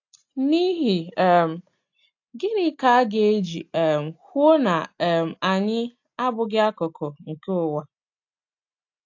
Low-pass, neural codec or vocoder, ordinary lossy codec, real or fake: 7.2 kHz; none; AAC, 48 kbps; real